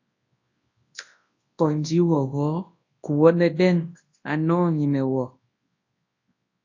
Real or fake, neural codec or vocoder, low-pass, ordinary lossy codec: fake; codec, 24 kHz, 0.9 kbps, WavTokenizer, large speech release; 7.2 kHz; AAC, 48 kbps